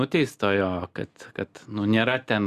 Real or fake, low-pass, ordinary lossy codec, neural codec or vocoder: real; 14.4 kHz; Opus, 64 kbps; none